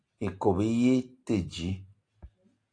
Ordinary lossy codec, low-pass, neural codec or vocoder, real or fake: AAC, 48 kbps; 9.9 kHz; none; real